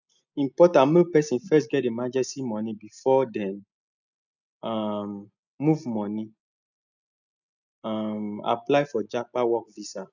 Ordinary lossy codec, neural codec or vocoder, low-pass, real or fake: none; none; 7.2 kHz; real